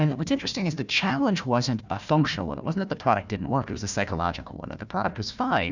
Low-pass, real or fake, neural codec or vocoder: 7.2 kHz; fake; codec, 16 kHz, 1 kbps, FunCodec, trained on Chinese and English, 50 frames a second